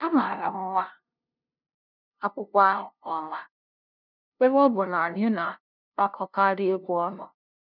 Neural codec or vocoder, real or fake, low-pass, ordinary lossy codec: codec, 16 kHz, 0.5 kbps, FunCodec, trained on LibriTTS, 25 frames a second; fake; 5.4 kHz; none